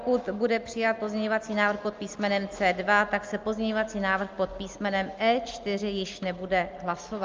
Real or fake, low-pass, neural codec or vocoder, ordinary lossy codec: real; 7.2 kHz; none; Opus, 32 kbps